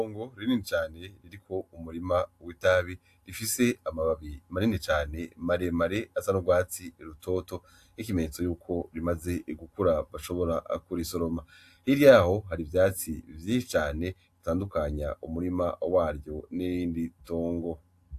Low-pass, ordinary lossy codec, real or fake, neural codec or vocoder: 14.4 kHz; AAC, 64 kbps; real; none